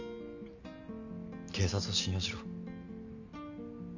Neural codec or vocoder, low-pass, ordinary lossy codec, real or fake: none; 7.2 kHz; none; real